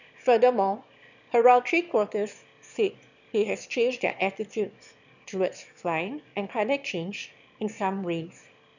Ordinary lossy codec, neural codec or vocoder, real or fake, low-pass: none; autoencoder, 22.05 kHz, a latent of 192 numbers a frame, VITS, trained on one speaker; fake; 7.2 kHz